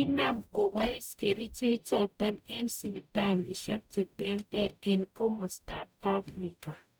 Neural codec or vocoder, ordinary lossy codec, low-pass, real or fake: codec, 44.1 kHz, 0.9 kbps, DAC; none; none; fake